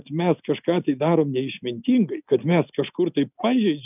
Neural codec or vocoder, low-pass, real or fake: none; 3.6 kHz; real